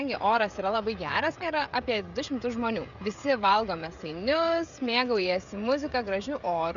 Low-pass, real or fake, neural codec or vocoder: 7.2 kHz; fake; codec, 16 kHz, 16 kbps, FreqCodec, smaller model